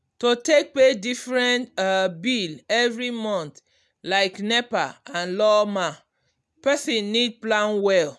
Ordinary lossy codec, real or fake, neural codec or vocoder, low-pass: none; real; none; none